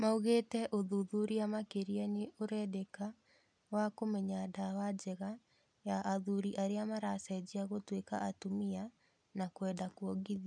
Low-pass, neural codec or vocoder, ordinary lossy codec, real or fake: 9.9 kHz; none; none; real